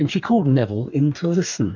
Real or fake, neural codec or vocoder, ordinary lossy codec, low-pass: fake; codec, 44.1 kHz, 2.6 kbps, DAC; MP3, 64 kbps; 7.2 kHz